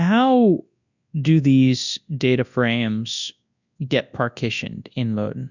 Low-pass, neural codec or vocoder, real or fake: 7.2 kHz; codec, 24 kHz, 0.9 kbps, WavTokenizer, large speech release; fake